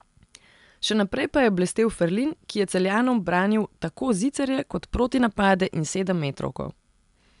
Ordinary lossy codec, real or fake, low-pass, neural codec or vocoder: MP3, 96 kbps; real; 10.8 kHz; none